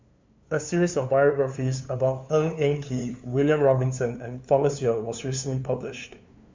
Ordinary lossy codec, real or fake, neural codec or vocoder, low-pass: MP3, 64 kbps; fake; codec, 16 kHz, 2 kbps, FunCodec, trained on LibriTTS, 25 frames a second; 7.2 kHz